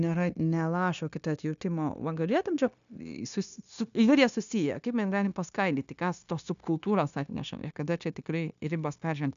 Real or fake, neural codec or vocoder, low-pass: fake; codec, 16 kHz, 0.9 kbps, LongCat-Audio-Codec; 7.2 kHz